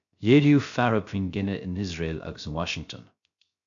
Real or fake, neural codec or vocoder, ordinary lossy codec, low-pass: fake; codec, 16 kHz, 0.3 kbps, FocalCodec; AAC, 64 kbps; 7.2 kHz